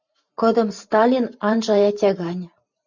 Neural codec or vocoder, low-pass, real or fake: vocoder, 44.1 kHz, 128 mel bands every 512 samples, BigVGAN v2; 7.2 kHz; fake